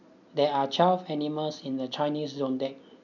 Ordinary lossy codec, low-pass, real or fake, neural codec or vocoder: none; 7.2 kHz; real; none